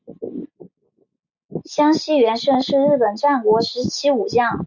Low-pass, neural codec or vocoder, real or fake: 7.2 kHz; none; real